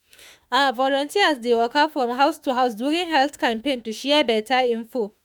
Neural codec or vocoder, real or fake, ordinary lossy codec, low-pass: autoencoder, 48 kHz, 32 numbers a frame, DAC-VAE, trained on Japanese speech; fake; none; none